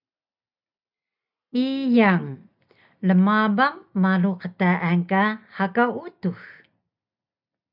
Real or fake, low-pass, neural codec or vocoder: real; 5.4 kHz; none